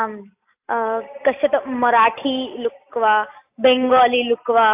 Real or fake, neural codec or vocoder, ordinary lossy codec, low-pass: real; none; AAC, 32 kbps; 3.6 kHz